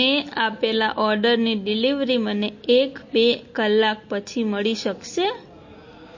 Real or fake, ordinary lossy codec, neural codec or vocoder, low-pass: real; MP3, 32 kbps; none; 7.2 kHz